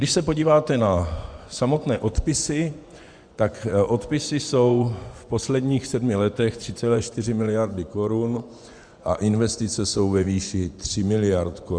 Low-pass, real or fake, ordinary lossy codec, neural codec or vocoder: 9.9 kHz; fake; Opus, 64 kbps; vocoder, 24 kHz, 100 mel bands, Vocos